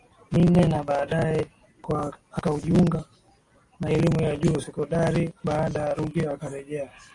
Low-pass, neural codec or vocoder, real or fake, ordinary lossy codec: 10.8 kHz; none; real; AAC, 48 kbps